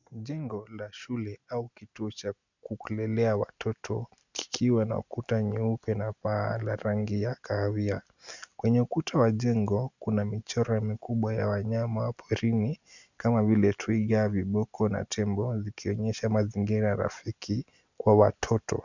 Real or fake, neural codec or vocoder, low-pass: real; none; 7.2 kHz